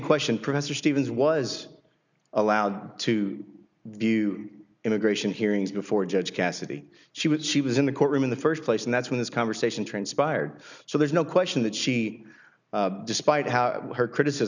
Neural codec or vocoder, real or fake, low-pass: none; real; 7.2 kHz